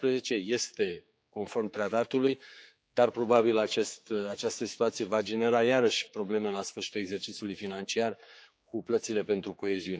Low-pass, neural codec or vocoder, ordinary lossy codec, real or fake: none; codec, 16 kHz, 4 kbps, X-Codec, HuBERT features, trained on general audio; none; fake